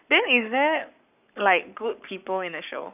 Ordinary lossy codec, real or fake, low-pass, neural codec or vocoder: none; fake; 3.6 kHz; codec, 44.1 kHz, 7.8 kbps, Pupu-Codec